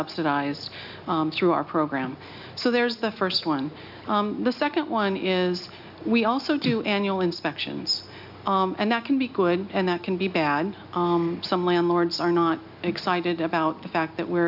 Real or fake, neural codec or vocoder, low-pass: real; none; 5.4 kHz